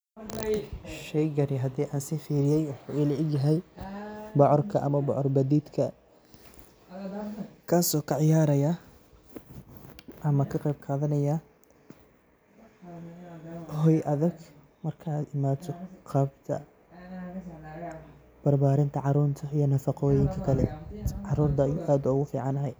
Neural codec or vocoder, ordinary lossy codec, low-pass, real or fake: none; none; none; real